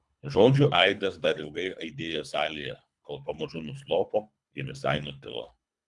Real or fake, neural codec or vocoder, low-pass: fake; codec, 24 kHz, 3 kbps, HILCodec; 10.8 kHz